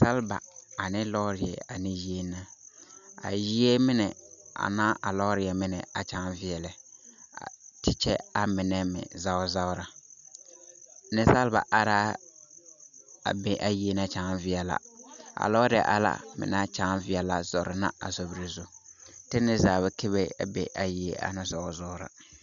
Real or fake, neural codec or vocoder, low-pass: real; none; 7.2 kHz